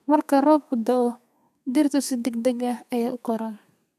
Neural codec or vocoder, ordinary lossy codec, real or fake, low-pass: codec, 32 kHz, 1.9 kbps, SNAC; none; fake; 14.4 kHz